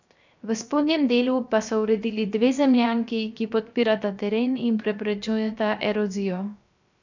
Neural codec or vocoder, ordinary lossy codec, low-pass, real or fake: codec, 16 kHz, 0.7 kbps, FocalCodec; none; 7.2 kHz; fake